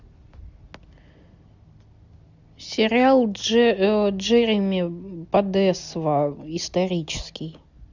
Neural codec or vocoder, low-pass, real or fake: none; 7.2 kHz; real